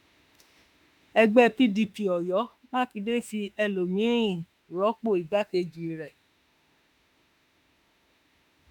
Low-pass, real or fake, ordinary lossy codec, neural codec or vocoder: 19.8 kHz; fake; none; autoencoder, 48 kHz, 32 numbers a frame, DAC-VAE, trained on Japanese speech